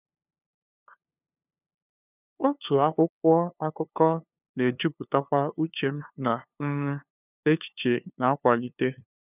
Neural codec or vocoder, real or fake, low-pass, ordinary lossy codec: codec, 16 kHz, 2 kbps, FunCodec, trained on LibriTTS, 25 frames a second; fake; 3.6 kHz; none